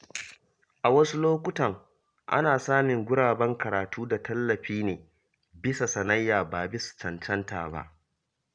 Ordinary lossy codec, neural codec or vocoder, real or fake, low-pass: none; none; real; 9.9 kHz